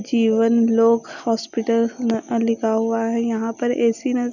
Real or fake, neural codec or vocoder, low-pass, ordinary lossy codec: real; none; 7.2 kHz; none